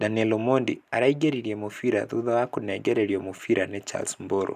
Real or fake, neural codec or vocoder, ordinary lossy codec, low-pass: real; none; none; 14.4 kHz